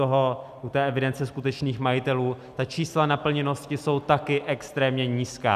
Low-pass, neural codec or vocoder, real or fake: 14.4 kHz; none; real